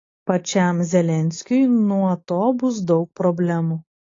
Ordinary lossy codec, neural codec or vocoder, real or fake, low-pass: AAC, 32 kbps; none; real; 7.2 kHz